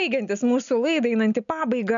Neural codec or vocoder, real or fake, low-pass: none; real; 7.2 kHz